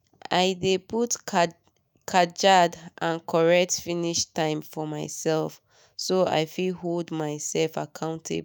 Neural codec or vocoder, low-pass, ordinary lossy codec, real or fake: autoencoder, 48 kHz, 128 numbers a frame, DAC-VAE, trained on Japanese speech; none; none; fake